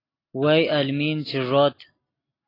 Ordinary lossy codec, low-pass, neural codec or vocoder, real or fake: AAC, 24 kbps; 5.4 kHz; none; real